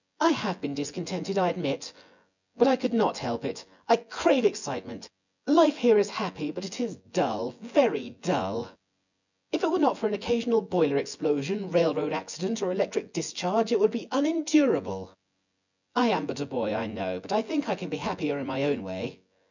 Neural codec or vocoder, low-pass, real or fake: vocoder, 24 kHz, 100 mel bands, Vocos; 7.2 kHz; fake